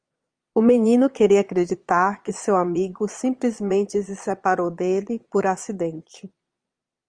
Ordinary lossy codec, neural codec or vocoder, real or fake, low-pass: Opus, 32 kbps; vocoder, 22.05 kHz, 80 mel bands, Vocos; fake; 9.9 kHz